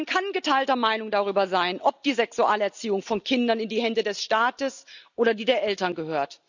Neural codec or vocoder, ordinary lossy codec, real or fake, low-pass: none; none; real; 7.2 kHz